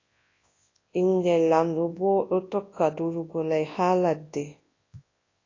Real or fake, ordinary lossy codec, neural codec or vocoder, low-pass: fake; AAC, 32 kbps; codec, 24 kHz, 0.9 kbps, WavTokenizer, large speech release; 7.2 kHz